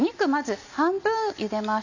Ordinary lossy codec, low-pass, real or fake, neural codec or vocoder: none; 7.2 kHz; real; none